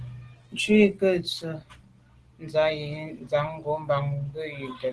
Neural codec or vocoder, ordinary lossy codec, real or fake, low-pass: none; Opus, 16 kbps; real; 10.8 kHz